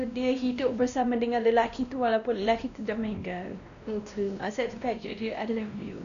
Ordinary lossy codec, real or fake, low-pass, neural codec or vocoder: none; fake; 7.2 kHz; codec, 16 kHz, 1 kbps, X-Codec, WavLM features, trained on Multilingual LibriSpeech